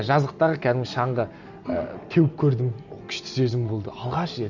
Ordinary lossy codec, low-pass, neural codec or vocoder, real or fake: none; 7.2 kHz; none; real